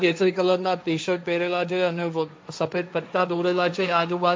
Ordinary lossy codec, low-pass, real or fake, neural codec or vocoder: none; none; fake; codec, 16 kHz, 1.1 kbps, Voila-Tokenizer